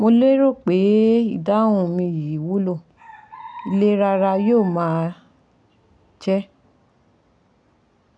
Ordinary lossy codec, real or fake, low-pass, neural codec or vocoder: none; real; 9.9 kHz; none